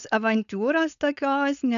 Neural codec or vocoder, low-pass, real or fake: codec, 16 kHz, 4.8 kbps, FACodec; 7.2 kHz; fake